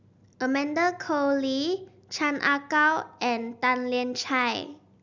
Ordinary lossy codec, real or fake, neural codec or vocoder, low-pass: none; real; none; 7.2 kHz